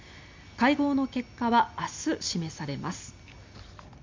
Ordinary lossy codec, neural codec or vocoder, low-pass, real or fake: MP3, 64 kbps; none; 7.2 kHz; real